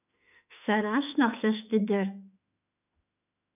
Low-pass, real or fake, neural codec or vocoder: 3.6 kHz; fake; autoencoder, 48 kHz, 32 numbers a frame, DAC-VAE, trained on Japanese speech